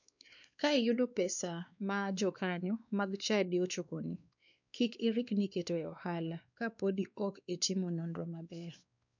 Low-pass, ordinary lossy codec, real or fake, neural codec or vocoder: 7.2 kHz; none; fake; codec, 16 kHz, 2 kbps, X-Codec, WavLM features, trained on Multilingual LibriSpeech